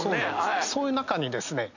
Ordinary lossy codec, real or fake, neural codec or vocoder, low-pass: none; real; none; 7.2 kHz